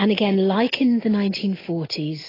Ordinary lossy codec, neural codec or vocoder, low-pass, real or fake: AAC, 24 kbps; none; 5.4 kHz; real